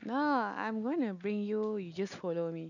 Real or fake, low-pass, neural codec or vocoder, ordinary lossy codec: real; 7.2 kHz; none; none